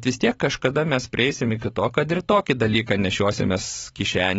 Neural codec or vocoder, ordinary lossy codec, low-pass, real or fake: vocoder, 44.1 kHz, 128 mel bands every 512 samples, BigVGAN v2; AAC, 24 kbps; 19.8 kHz; fake